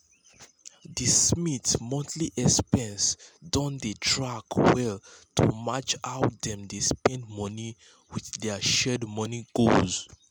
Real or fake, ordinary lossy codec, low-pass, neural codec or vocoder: real; none; none; none